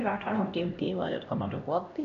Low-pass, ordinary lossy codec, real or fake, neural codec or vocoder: 7.2 kHz; none; fake; codec, 16 kHz, 1 kbps, X-Codec, HuBERT features, trained on LibriSpeech